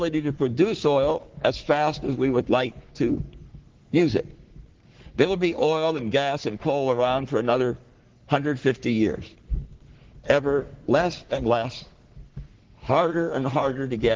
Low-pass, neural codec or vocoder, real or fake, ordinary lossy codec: 7.2 kHz; codec, 44.1 kHz, 3.4 kbps, Pupu-Codec; fake; Opus, 16 kbps